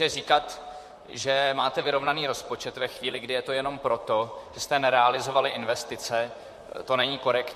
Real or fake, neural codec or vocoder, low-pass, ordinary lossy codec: fake; vocoder, 44.1 kHz, 128 mel bands, Pupu-Vocoder; 14.4 kHz; MP3, 64 kbps